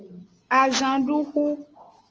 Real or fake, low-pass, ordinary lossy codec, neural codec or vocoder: real; 7.2 kHz; Opus, 24 kbps; none